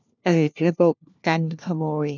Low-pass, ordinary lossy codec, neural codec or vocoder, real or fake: 7.2 kHz; none; codec, 16 kHz, 1 kbps, FunCodec, trained on LibriTTS, 50 frames a second; fake